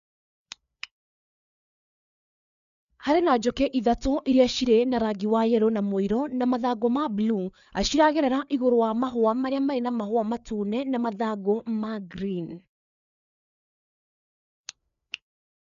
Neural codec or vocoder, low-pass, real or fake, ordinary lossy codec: codec, 16 kHz, 4 kbps, FreqCodec, larger model; 7.2 kHz; fake; none